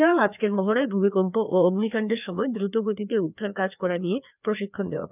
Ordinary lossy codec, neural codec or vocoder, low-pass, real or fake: none; codec, 16 kHz, 2 kbps, FreqCodec, larger model; 3.6 kHz; fake